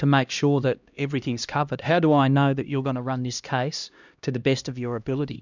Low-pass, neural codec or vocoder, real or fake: 7.2 kHz; codec, 16 kHz, 1 kbps, X-Codec, HuBERT features, trained on LibriSpeech; fake